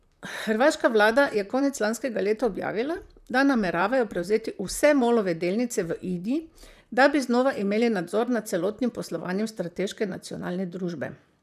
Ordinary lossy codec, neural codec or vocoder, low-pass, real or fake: none; vocoder, 44.1 kHz, 128 mel bands, Pupu-Vocoder; 14.4 kHz; fake